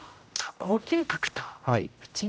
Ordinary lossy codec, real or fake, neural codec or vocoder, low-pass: none; fake; codec, 16 kHz, 0.5 kbps, X-Codec, HuBERT features, trained on general audio; none